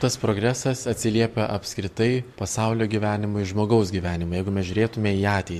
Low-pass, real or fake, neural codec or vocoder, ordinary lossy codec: 14.4 kHz; fake; vocoder, 44.1 kHz, 128 mel bands every 512 samples, BigVGAN v2; MP3, 64 kbps